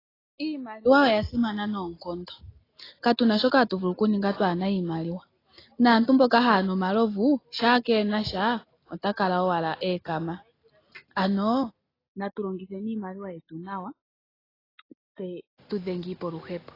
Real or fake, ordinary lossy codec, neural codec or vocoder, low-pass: real; AAC, 24 kbps; none; 5.4 kHz